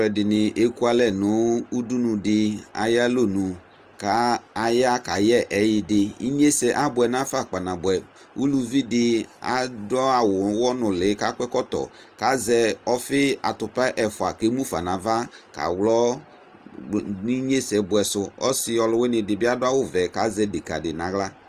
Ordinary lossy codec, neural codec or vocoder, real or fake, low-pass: Opus, 24 kbps; none; real; 14.4 kHz